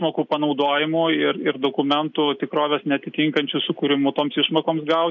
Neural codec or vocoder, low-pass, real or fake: none; 7.2 kHz; real